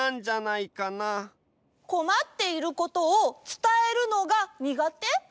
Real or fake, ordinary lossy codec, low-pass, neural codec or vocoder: real; none; none; none